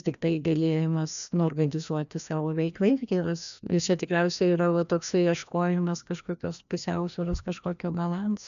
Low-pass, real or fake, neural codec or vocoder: 7.2 kHz; fake; codec, 16 kHz, 1 kbps, FreqCodec, larger model